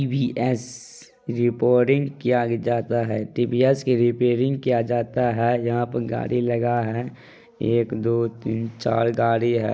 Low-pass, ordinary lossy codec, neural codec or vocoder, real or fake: none; none; none; real